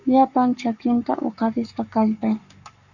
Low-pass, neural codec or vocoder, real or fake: 7.2 kHz; codec, 44.1 kHz, 7.8 kbps, Pupu-Codec; fake